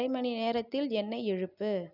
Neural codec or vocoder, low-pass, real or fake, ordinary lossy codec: none; 5.4 kHz; real; none